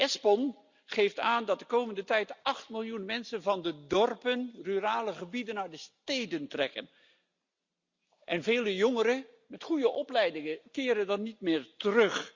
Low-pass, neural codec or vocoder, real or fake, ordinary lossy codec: 7.2 kHz; none; real; Opus, 64 kbps